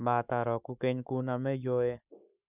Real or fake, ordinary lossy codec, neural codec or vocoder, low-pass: fake; none; codec, 44.1 kHz, 7.8 kbps, Pupu-Codec; 3.6 kHz